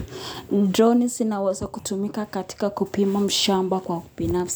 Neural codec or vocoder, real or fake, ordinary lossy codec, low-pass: none; real; none; none